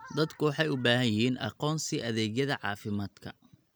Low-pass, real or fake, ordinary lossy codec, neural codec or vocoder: none; real; none; none